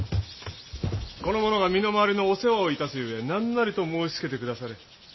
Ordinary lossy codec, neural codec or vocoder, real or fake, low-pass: MP3, 24 kbps; none; real; 7.2 kHz